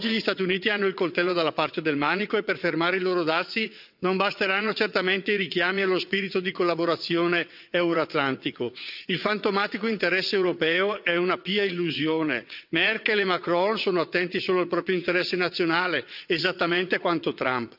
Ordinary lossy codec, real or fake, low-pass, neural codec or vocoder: none; fake; 5.4 kHz; vocoder, 22.05 kHz, 80 mel bands, WaveNeXt